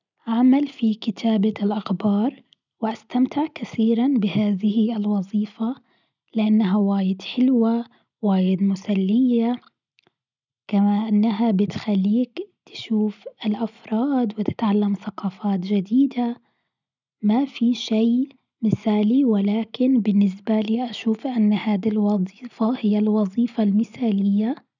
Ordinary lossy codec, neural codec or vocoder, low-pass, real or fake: none; none; 7.2 kHz; real